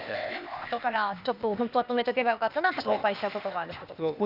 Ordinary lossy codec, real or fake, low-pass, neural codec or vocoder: none; fake; 5.4 kHz; codec, 16 kHz, 0.8 kbps, ZipCodec